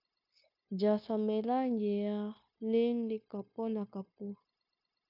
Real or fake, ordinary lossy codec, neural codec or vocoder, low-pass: fake; AAC, 32 kbps; codec, 16 kHz, 0.9 kbps, LongCat-Audio-Codec; 5.4 kHz